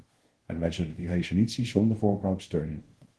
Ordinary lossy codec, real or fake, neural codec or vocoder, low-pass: Opus, 16 kbps; fake; codec, 24 kHz, 0.5 kbps, DualCodec; 10.8 kHz